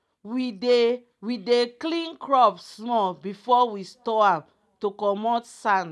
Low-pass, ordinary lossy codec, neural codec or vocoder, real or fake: none; none; none; real